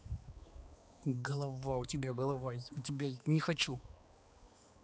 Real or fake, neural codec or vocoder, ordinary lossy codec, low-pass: fake; codec, 16 kHz, 2 kbps, X-Codec, HuBERT features, trained on balanced general audio; none; none